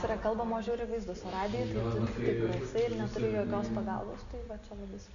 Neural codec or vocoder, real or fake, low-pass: none; real; 7.2 kHz